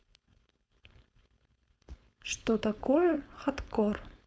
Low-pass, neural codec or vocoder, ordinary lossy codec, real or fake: none; codec, 16 kHz, 4.8 kbps, FACodec; none; fake